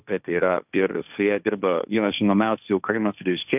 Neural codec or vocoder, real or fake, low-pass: codec, 16 kHz, 1.1 kbps, Voila-Tokenizer; fake; 3.6 kHz